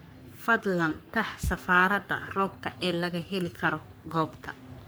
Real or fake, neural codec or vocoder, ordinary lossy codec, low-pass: fake; codec, 44.1 kHz, 3.4 kbps, Pupu-Codec; none; none